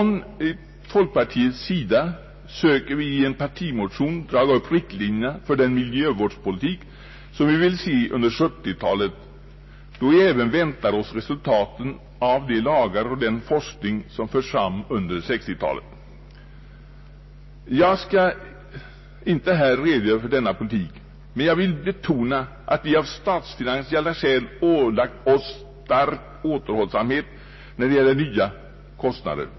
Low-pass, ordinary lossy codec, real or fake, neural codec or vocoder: 7.2 kHz; MP3, 24 kbps; real; none